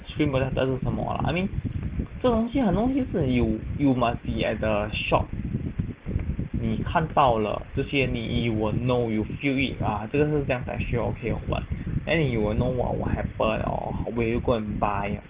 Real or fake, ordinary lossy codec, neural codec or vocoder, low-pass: real; Opus, 16 kbps; none; 3.6 kHz